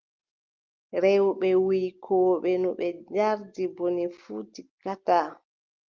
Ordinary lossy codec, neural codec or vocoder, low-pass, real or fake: Opus, 32 kbps; none; 7.2 kHz; real